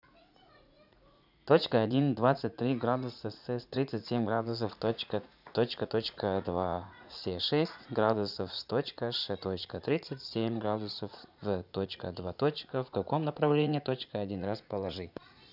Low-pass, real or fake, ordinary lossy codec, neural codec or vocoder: 5.4 kHz; fake; none; vocoder, 44.1 kHz, 80 mel bands, Vocos